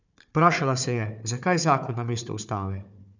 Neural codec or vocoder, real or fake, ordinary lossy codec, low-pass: codec, 16 kHz, 4 kbps, FunCodec, trained on Chinese and English, 50 frames a second; fake; none; 7.2 kHz